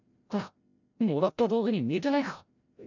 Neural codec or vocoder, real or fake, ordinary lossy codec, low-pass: codec, 16 kHz, 0.5 kbps, FreqCodec, larger model; fake; none; 7.2 kHz